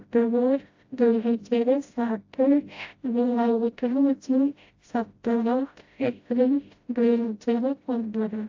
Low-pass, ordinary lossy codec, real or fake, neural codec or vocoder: 7.2 kHz; AAC, 48 kbps; fake; codec, 16 kHz, 0.5 kbps, FreqCodec, smaller model